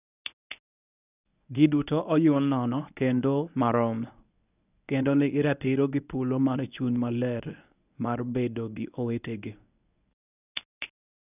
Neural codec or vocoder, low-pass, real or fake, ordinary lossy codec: codec, 24 kHz, 0.9 kbps, WavTokenizer, medium speech release version 1; 3.6 kHz; fake; none